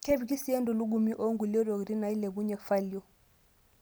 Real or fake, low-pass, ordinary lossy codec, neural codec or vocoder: real; none; none; none